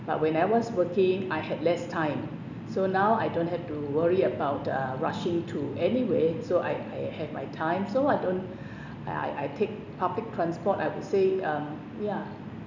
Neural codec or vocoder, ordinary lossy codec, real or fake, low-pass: none; none; real; 7.2 kHz